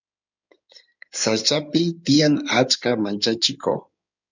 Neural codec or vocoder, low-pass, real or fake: codec, 16 kHz in and 24 kHz out, 2.2 kbps, FireRedTTS-2 codec; 7.2 kHz; fake